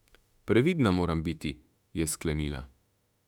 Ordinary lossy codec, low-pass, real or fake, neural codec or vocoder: none; 19.8 kHz; fake; autoencoder, 48 kHz, 32 numbers a frame, DAC-VAE, trained on Japanese speech